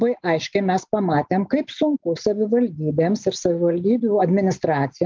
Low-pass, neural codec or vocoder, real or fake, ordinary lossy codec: 7.2 kHz; none; real; Opus, 24 kbps